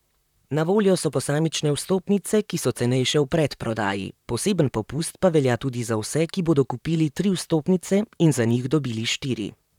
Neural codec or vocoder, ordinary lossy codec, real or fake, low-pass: vocoder, 44.1 kHz, 128 mel bands, Pupu-Vocoder; none; fake; 19.8 kHz